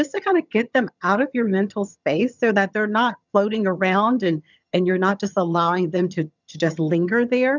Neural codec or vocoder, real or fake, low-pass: vocoder, 22.05 kHz, 80 mel bands, HiFi-GAN; fake; 7.2 kHz